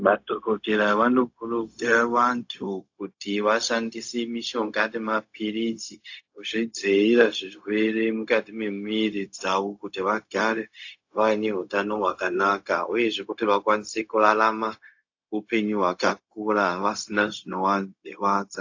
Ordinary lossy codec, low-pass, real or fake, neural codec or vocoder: AAC, 48 kbps; 7.2 kHz; fake; codec, 16 kHz, 0.4 kbps, LongCat-Audio-Codec